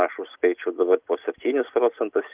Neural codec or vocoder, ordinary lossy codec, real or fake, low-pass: none; Opus, 24 kbps; real; 3.6 kHz